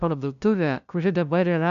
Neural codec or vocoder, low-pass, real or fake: codec, 16 kHz, 0.5 kbps, FunCodec, trained on LibriTTS, 25 frames a second; 7.2 kHz; fake